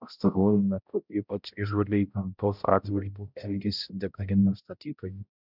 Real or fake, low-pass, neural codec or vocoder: fake; 5.4 kHz; codec, 16 kHz, 0.5 kbps, X-Codec, HuBERT features, trained on balanced general audio